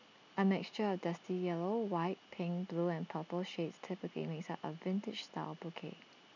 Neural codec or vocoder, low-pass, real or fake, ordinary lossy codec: none; 7.2 kHz; real; none